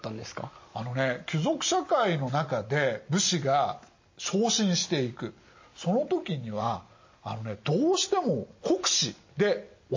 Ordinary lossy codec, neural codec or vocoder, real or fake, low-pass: MP3, 32 kbps; none; real; 7.2 kHz